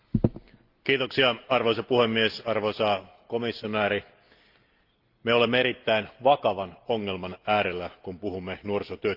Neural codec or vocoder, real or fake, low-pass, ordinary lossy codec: none; real; 5.4 kHz; Opus, 32 kbps